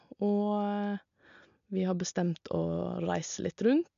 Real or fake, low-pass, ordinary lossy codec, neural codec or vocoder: real; 7.2 kHz; MP3, 96 kbps; none